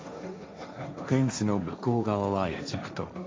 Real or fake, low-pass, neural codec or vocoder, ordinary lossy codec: fake; none; codec, 16 kHz, 1.1 kbps, Voila-Tokenizer; none